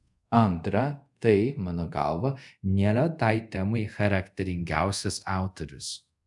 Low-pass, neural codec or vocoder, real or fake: 10.8 kHz; codec, 24 kHz, 0.5 kbps, DualCodec; fake